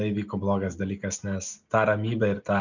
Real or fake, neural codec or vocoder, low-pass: real; none; 7.2 kHz